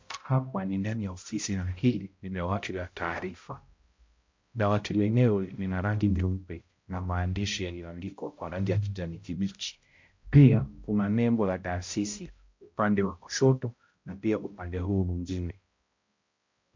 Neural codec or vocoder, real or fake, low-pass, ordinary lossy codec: codec, 16 kHz, 0.5 kbps, X-Codec, HuBERT features, trained on balanced general audio; fake; 7.2 kHz; MP3, 48 kbps